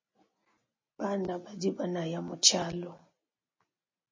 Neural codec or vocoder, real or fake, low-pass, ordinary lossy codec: none; real; 7.2 kHz; MP3, 32 kbps